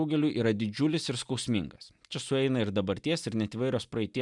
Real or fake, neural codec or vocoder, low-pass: real; none; 10.8 kHz